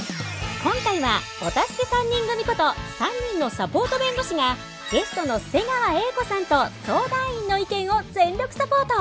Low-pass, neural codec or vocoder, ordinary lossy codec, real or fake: none; none; none; real